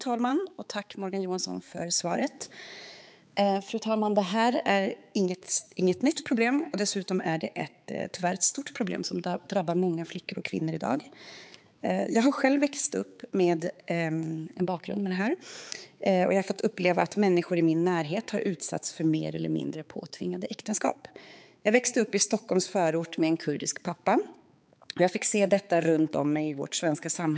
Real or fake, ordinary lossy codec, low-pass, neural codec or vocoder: fake; none; none; codec, 16 kHz, 4 kbps, X-Codec, HuBERT features, trained on balanced general audio